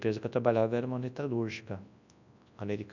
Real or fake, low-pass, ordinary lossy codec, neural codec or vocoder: fake; 7.2 kHz; none; codec, 24 kHz, 0.9 kbps, WavTokenizer, large speech release